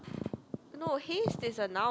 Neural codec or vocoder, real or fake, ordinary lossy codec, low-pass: none; real; none; none